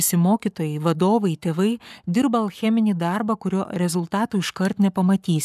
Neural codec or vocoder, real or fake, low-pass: codec, 44.1 kHz, 7.8 kbps, Pupu-Codec; fake; 14.4 kHz